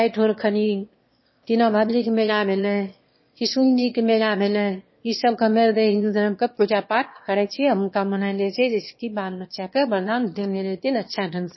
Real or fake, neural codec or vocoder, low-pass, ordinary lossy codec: fake; autoencoder, 22.05 kHz, a latent of 192 numbers a frame, VITS, trained on one speaker; 7.2 kHz; MP3, 24 kbps